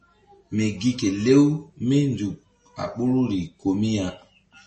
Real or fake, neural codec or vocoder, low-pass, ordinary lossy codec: real; none; 10.8 kHz; MP3, 32 kbps